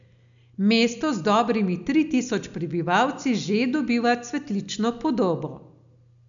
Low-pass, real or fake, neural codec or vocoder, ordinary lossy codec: 7.2 kHz; real; none; none